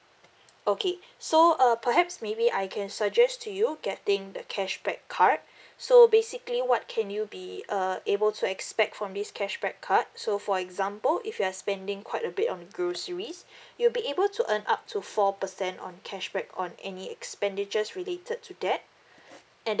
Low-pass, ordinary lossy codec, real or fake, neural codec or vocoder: none; none; real; none